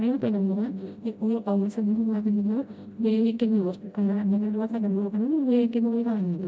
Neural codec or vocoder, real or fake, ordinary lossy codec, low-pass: codec, 16 kHz, 0.5 kbps, FreqCodec, smaller model; fake; none; none